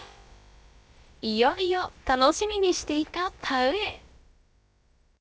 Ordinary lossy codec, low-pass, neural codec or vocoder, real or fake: none; none; codec, 16 kHz, about 1 kbps, DyCAST, with the encoder's durations; fake